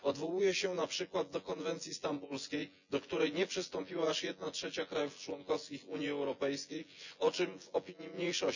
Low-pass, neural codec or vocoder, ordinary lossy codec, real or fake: 7.2 kHz; vocoder, 24 kHz, 100 mel bands, Vocos; none; fake